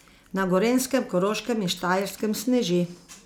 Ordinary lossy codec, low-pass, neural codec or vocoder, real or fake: none; none; none; real